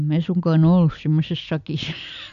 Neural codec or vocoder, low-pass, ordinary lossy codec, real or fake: none; 7.2 kHz; none; real